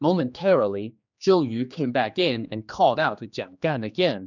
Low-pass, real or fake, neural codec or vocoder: 7.2 kHz; fake; codec, 16 kHz, 2 kbps, X-Codec, HuBERT features, trained on general audio